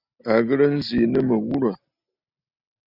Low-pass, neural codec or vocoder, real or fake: 5.4 kHz; none; real